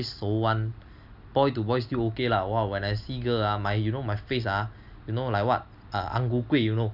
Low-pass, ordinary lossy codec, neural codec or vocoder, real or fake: 5.4 kHz; none; none; real